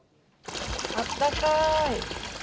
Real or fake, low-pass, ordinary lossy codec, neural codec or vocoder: real; none; none; none